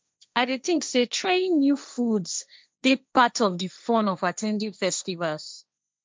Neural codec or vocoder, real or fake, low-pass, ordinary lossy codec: codec, 16 kHz, 1.1 kbps, Voila-Tokenizer; fake; none; none